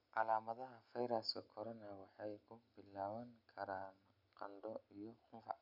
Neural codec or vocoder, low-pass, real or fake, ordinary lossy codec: none; 5.4 kHz; real; none